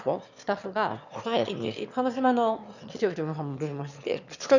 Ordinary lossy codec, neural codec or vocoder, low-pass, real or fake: none; autoencoder, 22.05 kHz, a latent of 192 numbers a frame, VITS, trained on one speaker; 7.2 kHz; fake